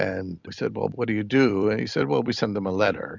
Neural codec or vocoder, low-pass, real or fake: vocoder, 44.1 kHz, 128 mel bands every 256 samples, BigVGAN v2; 7.2 kHz; fake